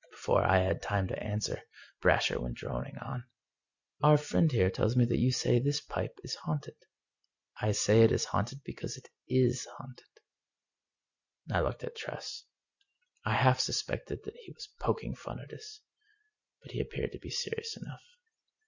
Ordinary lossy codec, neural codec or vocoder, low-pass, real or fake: Opus, 64 kbps; none; 7.2 kHz; real